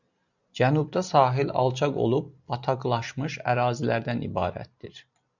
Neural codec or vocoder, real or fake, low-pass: none; real; 7.2 kHz